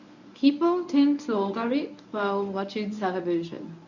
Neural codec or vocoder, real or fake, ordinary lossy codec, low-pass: codec, 24 kHz, 0.9 kbps, WavTokenizer, medium speech release version 1; fake; none; 7.2 kHz